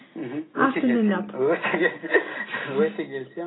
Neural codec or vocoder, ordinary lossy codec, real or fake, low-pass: none; AAC, 16 kbps; real; 7.2 kHz